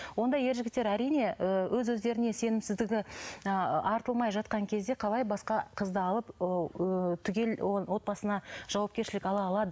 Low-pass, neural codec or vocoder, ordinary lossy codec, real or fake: none; none; none; real